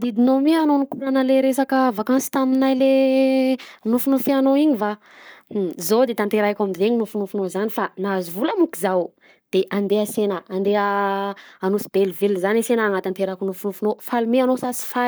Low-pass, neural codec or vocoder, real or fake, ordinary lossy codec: none; codec, 44.1 kHz, 7.8 kbps, Pupu-Codec; fake; none